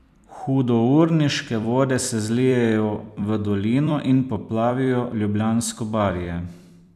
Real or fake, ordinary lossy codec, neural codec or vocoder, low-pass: fake; none; vocoder, 44.1 kHz, 128 mel bands every 256 samples, BigVGAN v2; 14.4 kHz